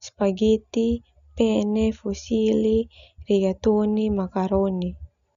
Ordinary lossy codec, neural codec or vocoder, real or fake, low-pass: MP3, 96 kbps; none; real; 7.2 kHz